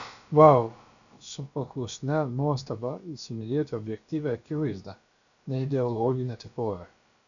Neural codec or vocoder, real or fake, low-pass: codec, 16 kHz, about 1 kbps, DyCAST, with the encoder's durations; fake; 7.2 kHz